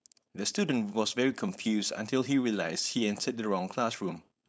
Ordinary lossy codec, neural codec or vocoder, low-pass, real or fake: none; codec, 16 kHz, 4.8 kbps, FACodec; none; fake